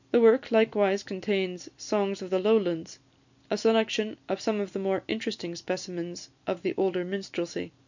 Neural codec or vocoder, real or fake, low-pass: none; real; 7.2 kHz